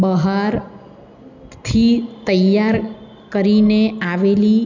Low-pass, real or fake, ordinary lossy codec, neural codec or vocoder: 7.2 kHz; real; none; none